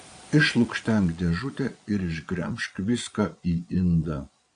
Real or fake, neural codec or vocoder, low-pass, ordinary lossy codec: fake; vocoder, 22.05 kHz, 80 mel bands, Vocos; 9.9 kHz; AAC, 48 kbps